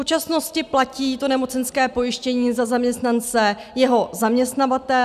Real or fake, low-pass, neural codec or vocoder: real; 14.4 kHz; none